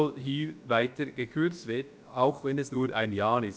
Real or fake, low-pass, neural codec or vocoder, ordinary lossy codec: fake; none; codec, 16 kHz, about 1 kbps, DyCAST, with the encoder's durations; none